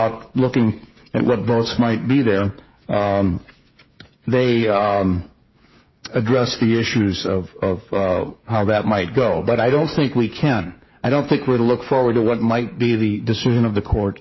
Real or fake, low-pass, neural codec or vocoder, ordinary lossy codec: fake; 7.2 kHz; codec, 16 kHz, 16 kbps, FreqCodec, smaller model; MP3, 24 kbps